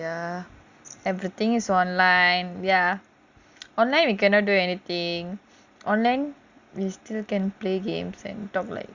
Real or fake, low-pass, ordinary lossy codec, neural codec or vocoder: real; 7.2 kHz; Opus, 64 kbps; none